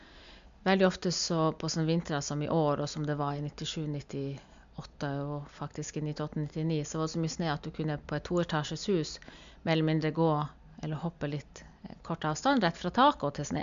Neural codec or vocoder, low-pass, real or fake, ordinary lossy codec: none; 7.2 kHz; real; MP3, 64 kbps